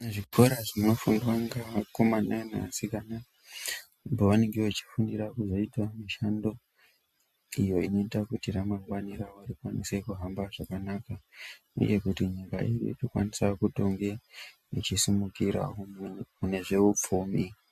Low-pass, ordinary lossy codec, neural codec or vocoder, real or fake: 14.4 kHz; MP3, 64 kbps; none; real